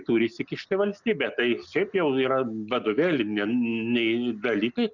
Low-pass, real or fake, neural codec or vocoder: 7.2 kHz; real; none